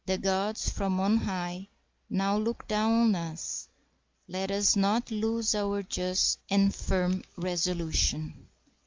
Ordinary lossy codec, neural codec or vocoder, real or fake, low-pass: Opus, 24 kbps; none; real; 7.2 kHz